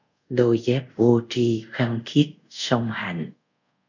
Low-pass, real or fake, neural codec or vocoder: 7.2 kHz; fake; codec, 24 kHz, 0.5 kbps, DualCodec